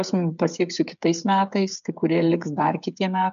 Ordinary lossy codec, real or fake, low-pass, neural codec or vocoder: MP3, 96 kbps; fake; 7.2 kHz; codec, 16 kHz, 8 kbps, FreqCodec, smaller model